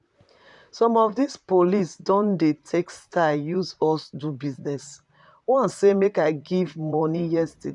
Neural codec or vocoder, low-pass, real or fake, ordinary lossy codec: vocoder, 44.1 kHz, 128 mel bands, Pupu-Vocoder; 10.8 kHz; fake; none